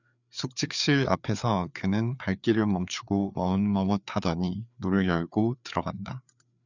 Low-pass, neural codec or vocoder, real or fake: 7.2 kHz; codec, 16 kHz, 4 kbps, FreqCodec, larger model; fake